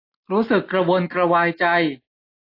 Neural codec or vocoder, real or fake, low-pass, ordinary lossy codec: none; real; 5.4 kHz; AAC, 32 kbps